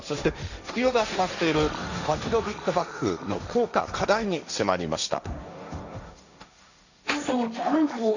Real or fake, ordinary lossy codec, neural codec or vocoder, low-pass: fake; none; codec, 16 kHz, 1.1 kbps, Voila-Tokenizer; 7.2 kHz